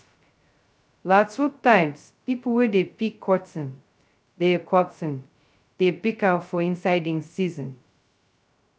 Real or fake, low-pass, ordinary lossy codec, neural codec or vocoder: fake; none; none; codec, 16 kHz, 0.2 kbps, FocalCodec